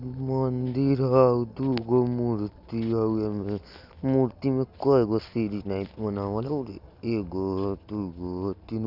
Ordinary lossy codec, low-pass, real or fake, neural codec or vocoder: none; 5.4 kHz; real; none